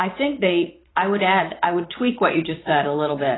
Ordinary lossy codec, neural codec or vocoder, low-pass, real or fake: AAC, 16 kbps; vocoder, 22.05 kHz, 80 mel bands, WaveNeXt; 7.2 kHz; fake